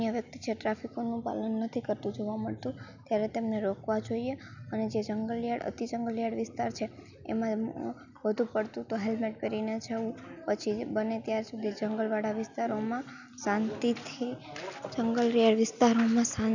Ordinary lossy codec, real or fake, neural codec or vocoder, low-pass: none; real; none; 7.2 kHz